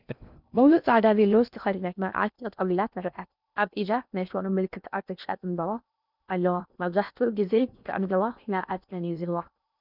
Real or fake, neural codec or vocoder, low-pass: fake; codec, 16 kHz in and 24 kHz out, 0.6 kbps, FocalCodec, streaming, 2048 codes; 5.4 kHz